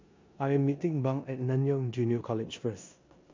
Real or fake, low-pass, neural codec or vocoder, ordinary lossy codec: fake; 7.2 kHz; codec, 16 kHz in and 24 kHz out, 0.9 kbps, LongCat-Audio-Codec, four codebook decoder; MP3, 48 kbps